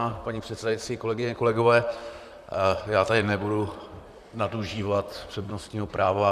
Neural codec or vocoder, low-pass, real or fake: vocoder, 44.1 kHz, 128 mel bands, Pupu-Vocoder; 14.4 kHz; fake